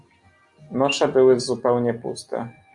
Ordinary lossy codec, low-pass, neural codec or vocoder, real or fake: AAC, 64 kbps; 10.8 kHz; none; real